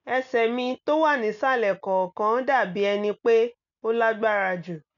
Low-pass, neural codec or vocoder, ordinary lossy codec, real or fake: 7.2 kHz; none; none; real